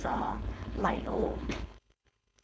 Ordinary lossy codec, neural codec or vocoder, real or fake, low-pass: none; codec, 16 kHz, 4.8 kbps, FACodec; fake; none